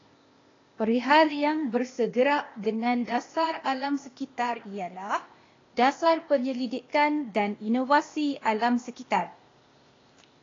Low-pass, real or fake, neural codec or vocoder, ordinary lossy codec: 7.2 kHz; fake; codec, 16 kHz, 0.8 kbps, ZipCodec; AAC, 32 kbps